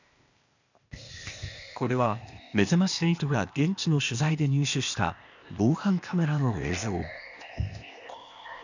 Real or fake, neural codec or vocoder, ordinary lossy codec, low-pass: fake; codec, 16 kHz, 0.8 kbps, ZipCodec; none; 7.2 kHz